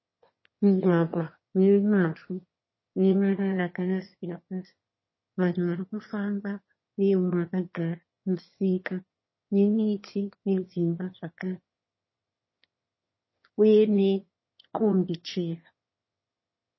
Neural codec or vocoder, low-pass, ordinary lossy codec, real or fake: autoencoder, 22.05 kHz, a latent of 192 numbers a frame, VITS, trained on one speaker; 7.2 kHz; MP3, 24 kbps; fake